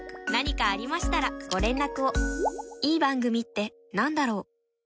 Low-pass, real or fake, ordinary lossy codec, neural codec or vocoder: none; real; none; none